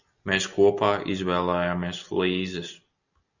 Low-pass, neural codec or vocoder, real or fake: 7.2 kHz; none; real